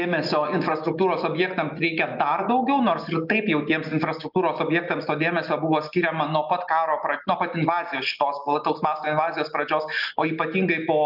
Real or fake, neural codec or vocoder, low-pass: real; none; 5.4 kHz